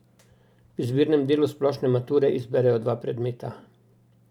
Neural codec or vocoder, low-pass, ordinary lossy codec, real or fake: vocoder, 44.1 kHz, 128 mel bands every 512 samples, BigVGAN v2; 19.8 kHz; none; fake